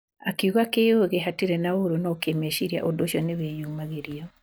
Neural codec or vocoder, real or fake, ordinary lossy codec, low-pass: none; real; none; none